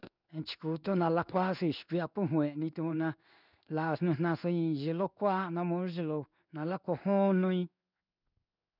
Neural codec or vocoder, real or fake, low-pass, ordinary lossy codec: codec, 16 kHz in and 24 kHz out, 1 kbps, XY-Tokenizer; fake; 5.4 kHz; none